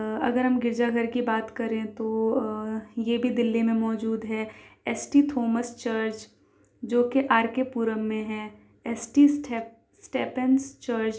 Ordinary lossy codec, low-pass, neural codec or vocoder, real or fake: none; none; none; real